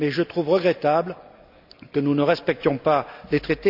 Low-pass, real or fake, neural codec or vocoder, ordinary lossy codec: 5.4 kHz; real; none; none